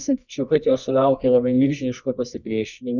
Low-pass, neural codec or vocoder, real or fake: 7.2 kHz; codec, 24 kHz, 0.9 kbps, WavTokenizer, medium music audio release; fake